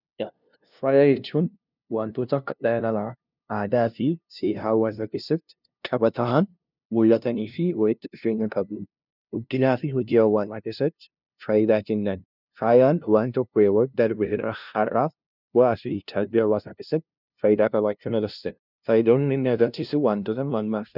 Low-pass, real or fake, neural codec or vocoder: 5.4 kHz; fake; codec, 16 kHz, 0.5 kbps, FunCodec, trained on LibriTTS, 25 frames a second